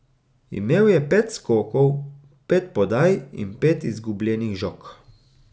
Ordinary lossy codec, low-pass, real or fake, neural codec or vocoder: none; none; real; none